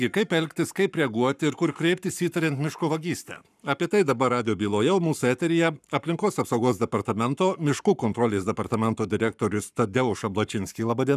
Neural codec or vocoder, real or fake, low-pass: codec, 44.1 kHz, 7.8 kbps, Pupu-Codec; fake; 14.4 kHz